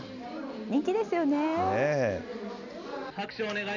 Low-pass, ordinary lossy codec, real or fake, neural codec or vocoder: 7.2 kHz; none; real; none